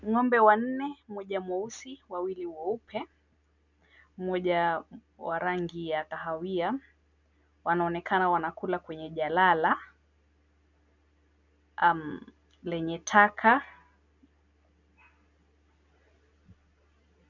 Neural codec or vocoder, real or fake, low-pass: none; real; 7.2 kHz